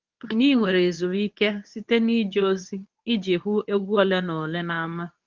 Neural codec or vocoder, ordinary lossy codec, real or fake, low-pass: codec, 24 kHz, 0.9 kbps, WavTokenizer, medium speech release version 2; Opus, 24 kbps; fake; 7.2 kHz